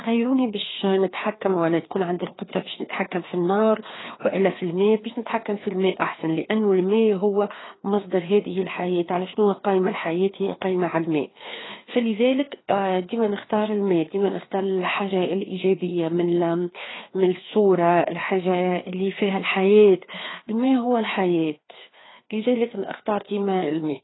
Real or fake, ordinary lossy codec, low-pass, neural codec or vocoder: fake; AAC, 16 kbps; 7.2 kHz; codec, 16 kHz, 2 kbps, FreqCodec, larger model